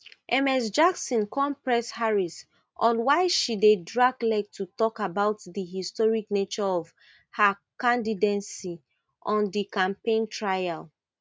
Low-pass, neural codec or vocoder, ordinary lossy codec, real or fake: none; none; none; real